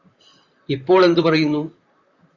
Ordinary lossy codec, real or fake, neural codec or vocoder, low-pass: Opus, 64 kbps; fake; vocoder, 44.1 kHz, 128 mel bands, Pupu-Vocoder; 7.2 kHz